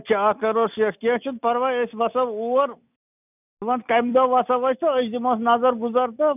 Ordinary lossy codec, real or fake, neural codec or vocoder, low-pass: none; real; none; 3.6 kHz